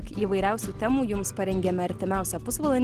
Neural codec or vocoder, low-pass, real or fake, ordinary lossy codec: none; 14.4 kHz; real; Opus, 16 kbps